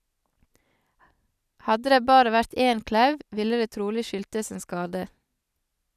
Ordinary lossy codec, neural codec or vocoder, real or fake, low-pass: none; none; real; 14.4 kHz